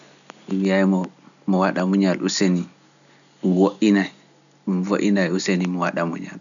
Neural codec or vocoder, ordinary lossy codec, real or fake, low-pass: none; none; real; 7.2 kHz